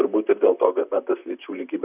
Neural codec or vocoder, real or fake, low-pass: vocoder, 44.1 kHz, 128 mel bands, Pupu-Vocoder; fake; 3.6 kHz